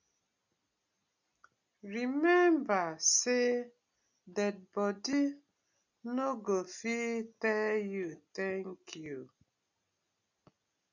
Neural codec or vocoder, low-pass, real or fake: none; 7.2 kHz; real